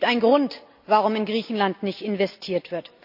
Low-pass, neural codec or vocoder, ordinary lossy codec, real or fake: 5.4 kHz; none; AAC, 48 kbps; real